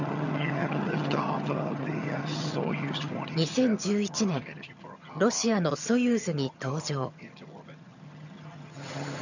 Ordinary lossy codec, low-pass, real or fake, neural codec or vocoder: none; 7.2 kHz; fake; vocoder, 22.05 kHz, 80 mel bands, HiFi-GAN